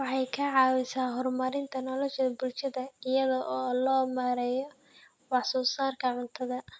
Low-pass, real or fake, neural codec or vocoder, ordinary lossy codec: none; real; none; none